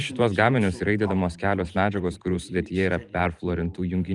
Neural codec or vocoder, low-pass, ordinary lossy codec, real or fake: vocoder, 44.1 kHz, 128 mel bands every 512 samples, BigVGAN v2; 10.8 kHz; Opus, 32 kbps; fake